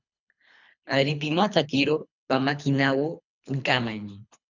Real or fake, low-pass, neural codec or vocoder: fake; 7.2 kHz; codec, 24 kHz, 3 kbps, HILCodec